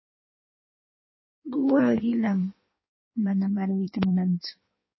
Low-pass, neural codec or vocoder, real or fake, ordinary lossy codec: 7.2 kHz; codec, 16 kHz in and 24 kHz out, 1.1 kbps, FireRedTTS-2 codec; fake; MP3, 24 kbps